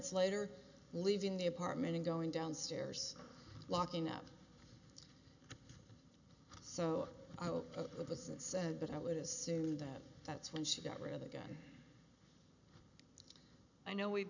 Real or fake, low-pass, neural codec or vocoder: real; 7.2 kHz; none